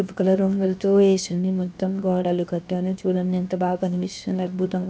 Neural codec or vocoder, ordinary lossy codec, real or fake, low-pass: codec, 16 kHz, 0.7 kbps, FocalCodec; none; fake; none